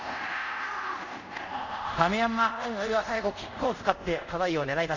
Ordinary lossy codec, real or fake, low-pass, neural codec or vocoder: none; fake; 7.2 kHz; codec, 24 kHz, 0.5 kbps, DualCodec